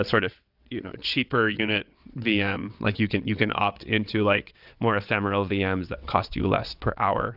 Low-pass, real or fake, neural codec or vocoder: 5.4 kHz; fake; vocoder, 22.05 kHz, 80 mel bands, WaveNeXt